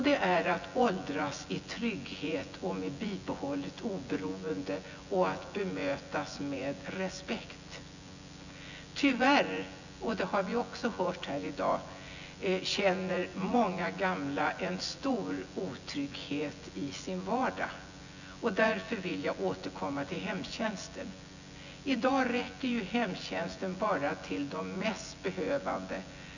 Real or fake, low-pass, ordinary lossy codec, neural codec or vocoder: fake; 7.2 kHz; none; vocoder, 24 kHz, 100 mel bands, Vocos